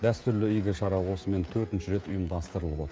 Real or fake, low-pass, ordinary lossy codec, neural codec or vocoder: fake; none; none; codec, 16 kHz, 16 kbps, FreqCodec, smaller model